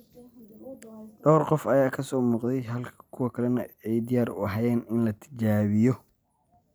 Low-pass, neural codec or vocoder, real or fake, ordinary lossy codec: none; none; real; none